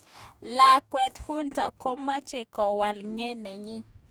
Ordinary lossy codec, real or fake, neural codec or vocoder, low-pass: none; fake; codec, 44.1 kHz, 2.6 kbps, DAC; none